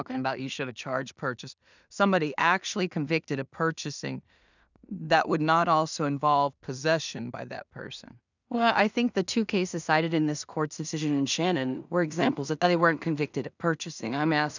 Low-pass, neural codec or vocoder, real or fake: 7.2 kHz; codec, 16 kHz in and 24 kHz out, 0.4 kbps, LongCat-Audio-Codec, two codebook decoder; fake